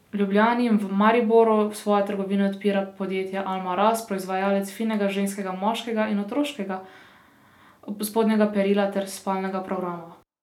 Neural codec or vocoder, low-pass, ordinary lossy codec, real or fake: none; 19.8 kHz; none; real